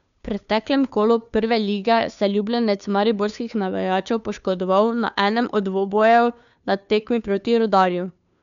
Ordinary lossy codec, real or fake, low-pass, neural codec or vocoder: none; fake; 7.2 kHz; codec, 16 kHz, 2 kbps, FunCodec, trained on Chinese and English, 25 frames a second